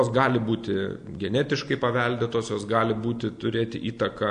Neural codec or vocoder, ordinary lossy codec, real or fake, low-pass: none; AAC, 64 kbps; real; 9.9 kHz